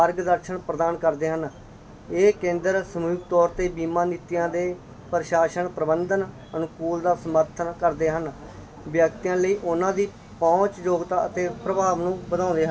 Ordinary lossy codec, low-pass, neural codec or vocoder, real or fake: none; none; none; real